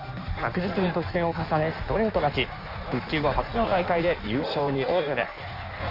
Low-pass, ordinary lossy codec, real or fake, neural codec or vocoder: 5.4 kHz; none; fake; codec, 16 kHz in and 24 kHz out, 1.1 kbps, FireRedTTS-2 codec